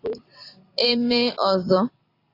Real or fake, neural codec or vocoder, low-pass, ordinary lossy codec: fake; vocoder, 44.1 kHz, 128 mel bands every 256 samples, BigVGAN v2; 5.4 kHz; AAC, 32 kbps